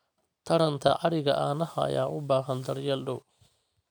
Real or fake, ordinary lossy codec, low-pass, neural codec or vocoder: real; none; none; none